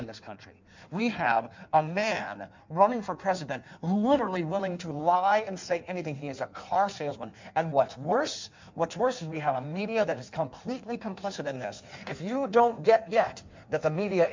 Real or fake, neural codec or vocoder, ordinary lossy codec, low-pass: fake; codec, 16 kHz in and 24 kHz out, 1.1 kbps, FireRedTTS-2 codec; MP3, 64 kbps; 7.2 kHz